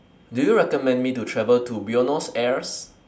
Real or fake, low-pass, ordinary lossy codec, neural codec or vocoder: real; none; none; none